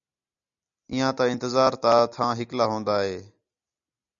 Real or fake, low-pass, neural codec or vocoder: real; 7.2 kHz; none